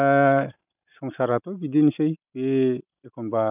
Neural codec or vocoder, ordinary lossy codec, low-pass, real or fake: none; none; 3.6 kHz; real